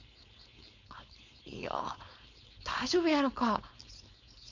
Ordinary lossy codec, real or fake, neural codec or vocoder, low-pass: none; fake; codec, 16 kHz, 4.8 kbps, FACodec; 7.2 kHz